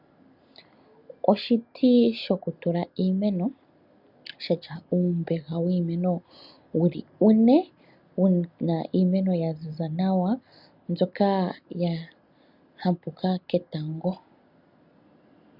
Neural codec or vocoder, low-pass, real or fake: none; 5.4 kHz; real